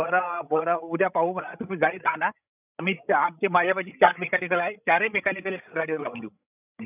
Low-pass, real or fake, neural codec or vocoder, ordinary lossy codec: 3.6 kHz; fake; codec, 16 kHz, 16 kbps, FreqCodec, larger model; none